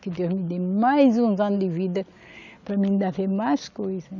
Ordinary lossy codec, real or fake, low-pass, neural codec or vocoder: none; real; 7.2 kHz; none